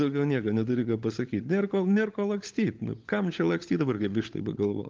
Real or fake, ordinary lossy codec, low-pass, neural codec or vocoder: fake; Opus, 32 kbps; 7.2 kHz; codec, 16 kHz, 16 kbps, FunCodec, trained on LibriTTS, 50 frames a second